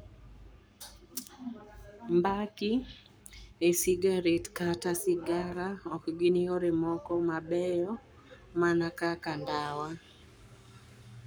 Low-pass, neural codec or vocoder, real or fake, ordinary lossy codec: none; codec, 44.1 kHz, 7.8 kbps, Pupu-Codec; fake; none